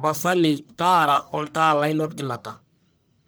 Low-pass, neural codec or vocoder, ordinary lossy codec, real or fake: none; codec, 44.1 kHz, 1.7 kbps, Pupu-Codec; none; fake